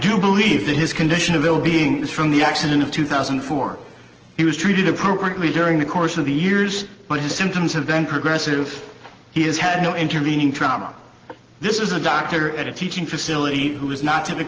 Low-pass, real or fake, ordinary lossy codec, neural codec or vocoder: 7.2 kHz; real; Opus, 16 kbps; none